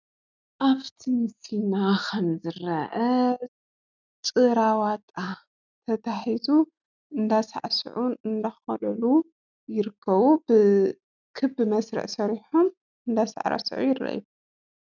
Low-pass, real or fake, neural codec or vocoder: 7.2 kHz; real; none